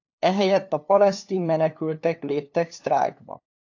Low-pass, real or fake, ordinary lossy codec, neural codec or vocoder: 7.2 kHz; fake; AAC, 48 kbps; codec, 16 kHz, 2 kbps, FunCodec, trained on LibriTTS, 25 frames a second